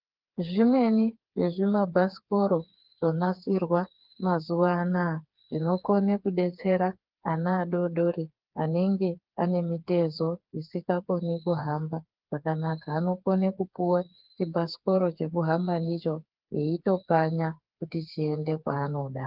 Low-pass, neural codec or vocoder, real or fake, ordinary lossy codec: 5.4 kHz; codec, 16 kHz, 4 kbps, FreqCodec, smaller model; fake; Opus, 32 kbps